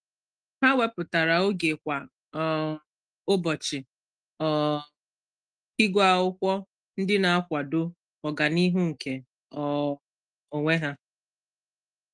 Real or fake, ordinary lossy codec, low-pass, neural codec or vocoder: real; Opus, 24 kbps; 9.9 kHz; none